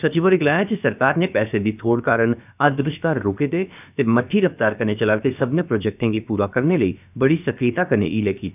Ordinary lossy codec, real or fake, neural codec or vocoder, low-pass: none; fake; codec, 16 kHz, about 1 kbps, DyCAST, with the encoder's durations; 3.6 kHz